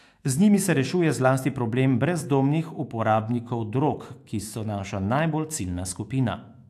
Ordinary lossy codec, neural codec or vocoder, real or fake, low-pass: AAC, 64 kbps; autoencoder, 48 kHz, 128 numbers a frame, DAC-VAE, trained on Japanese speech; fake; 14.4 kHz